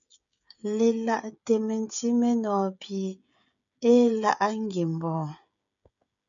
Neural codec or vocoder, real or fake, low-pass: codec, 16 kHz, 16 kbps, FreqCodec, smaller model; fake; 7.2 kHz